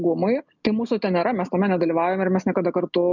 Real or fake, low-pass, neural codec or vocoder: real; 7.2 kHz; none